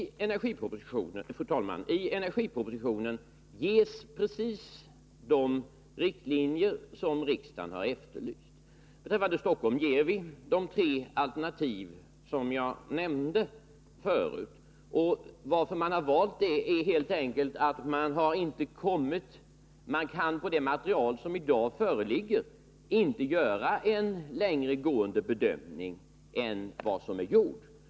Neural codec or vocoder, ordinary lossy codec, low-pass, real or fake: none; none; none; real